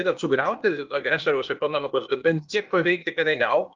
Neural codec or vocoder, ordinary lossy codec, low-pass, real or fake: codec, 16 kHz, 0.8 kbps, ZipCodec; Opus, 24 kbps; 7.2 kHz; fake